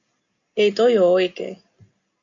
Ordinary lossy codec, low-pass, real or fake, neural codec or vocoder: MP3, 64 kbps; 7.2 kHz; real; none